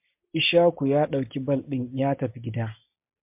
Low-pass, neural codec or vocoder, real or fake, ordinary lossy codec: 3.6 kHz; none; real; MP3, 32 kbps